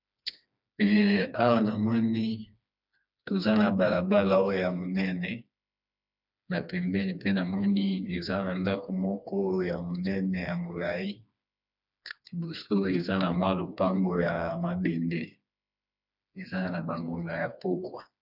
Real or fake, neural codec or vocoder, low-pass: fake; codec, 16 kHz, 2 kbps, FreqCodec, smaller model; 5.4 kHz